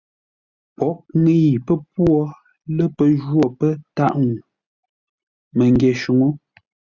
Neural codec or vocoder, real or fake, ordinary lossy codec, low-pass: none; real; Opus, 64 kbps; 7.2 kHz